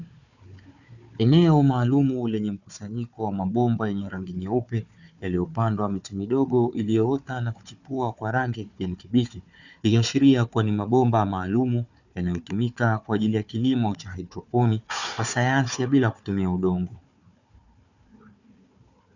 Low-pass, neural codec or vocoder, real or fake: 7.2 kHz; codec, 16 kHz, 4 kbps, FunCodec, trained on Chinese and English, 50 frames a second; fake